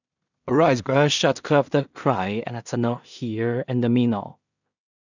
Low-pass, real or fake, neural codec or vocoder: 7.2 kHz; fake; codec, 16 kHz in and 24 kHz out, 0.4 kbps, LongCat-Audio-Codec, two codebook decoder